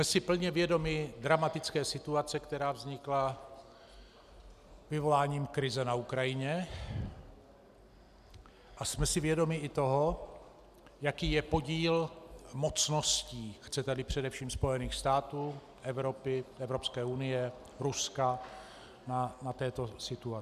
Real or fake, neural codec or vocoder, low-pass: real; none; 14.4 kHz